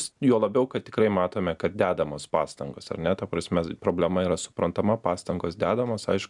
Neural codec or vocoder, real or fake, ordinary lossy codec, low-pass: none; real; MP3, 96 kbps; 10.8 kHz